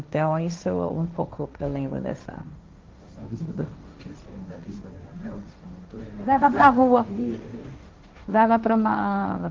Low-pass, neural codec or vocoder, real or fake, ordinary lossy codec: 7.2 kHz; codec, 16 kHz, 1.1 kbps, Voila-Tokenizer; fake; Opus, 32 kbps